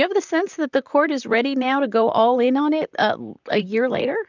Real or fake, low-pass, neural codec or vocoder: fake; 7.2 kHz; vocoder, 44.1 kHz, 128 mel bands, Pupu-Vocoder